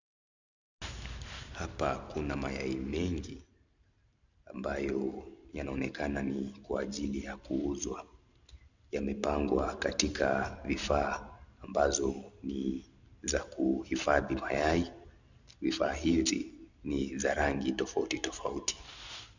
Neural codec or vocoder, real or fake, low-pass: none; real; 7.2 kHz